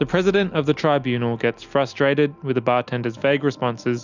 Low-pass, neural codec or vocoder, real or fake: 7.2 kHz; none; real